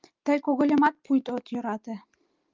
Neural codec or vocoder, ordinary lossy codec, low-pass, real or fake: none; Opus, 32 kbps; 7.2 kHz; real